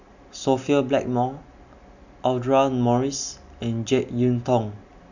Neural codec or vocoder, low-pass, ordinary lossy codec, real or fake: none; 7.2 kHz; none; real